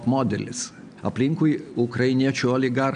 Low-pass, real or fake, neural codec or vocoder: 9.9 kHz; real; none